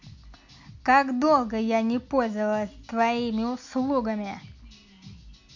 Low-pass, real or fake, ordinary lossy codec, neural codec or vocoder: 7.2 kHz; real; MP3, 48 kbps; none